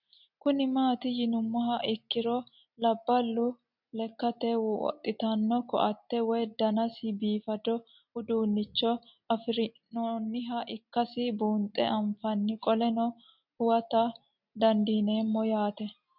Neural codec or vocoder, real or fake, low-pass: none; real; 5.4 kHz